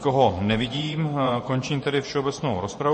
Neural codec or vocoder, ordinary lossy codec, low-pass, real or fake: vocoder, 24 kHz, 100 mel bands, Vocos; MP3, 32 kbps; 10.8 kHz; fake